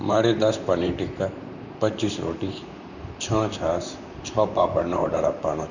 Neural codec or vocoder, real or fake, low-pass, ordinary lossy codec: vocoder, 44.1 kHz, 128 mel bands, Pupu-Vocoder; fake; 7.2 kHz; none